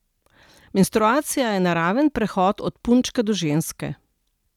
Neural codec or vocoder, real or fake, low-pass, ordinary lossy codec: none; real; 19.8 kHz; none